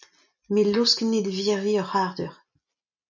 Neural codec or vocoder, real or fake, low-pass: none; real; 7.2 kHz